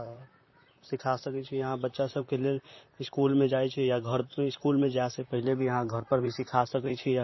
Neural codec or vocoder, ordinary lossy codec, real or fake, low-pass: vocoder, 44.1 kHz, 128 mel bands, Pupu-Vocoder; MP3, 24 kbps; fake; 7.2 kHz